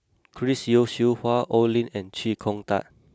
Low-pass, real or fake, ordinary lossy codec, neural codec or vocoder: none; real; none; none